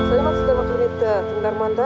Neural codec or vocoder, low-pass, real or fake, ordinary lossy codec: none; none; real; none